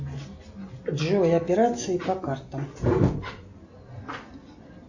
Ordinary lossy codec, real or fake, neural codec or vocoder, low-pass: Opus, 64 kbps; real; none; 7.2 kHz